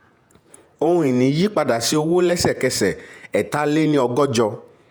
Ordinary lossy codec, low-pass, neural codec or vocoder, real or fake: none; none; vocoder, 48 kHz, 128 mel bands, Vocos; fake